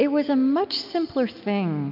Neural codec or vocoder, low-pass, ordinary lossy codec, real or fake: none; 5.4 kHz; MP3, 48 kbps; real